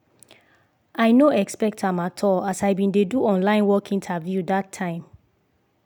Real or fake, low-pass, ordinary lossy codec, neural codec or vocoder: real; none; none; none